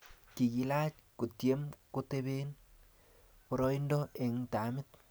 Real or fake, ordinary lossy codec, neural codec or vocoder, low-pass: real; none; none; none